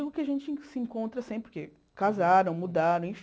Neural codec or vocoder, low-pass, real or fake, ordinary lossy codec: none; none; real; none